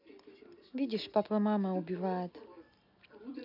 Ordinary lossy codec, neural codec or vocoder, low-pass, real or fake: none; none; 5.4 kHz; real